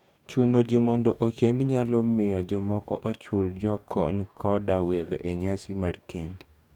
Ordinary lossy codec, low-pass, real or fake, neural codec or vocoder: none; 19.8 kHz; fake; codec, 44.1 kHz, 2.6 kbps, DAC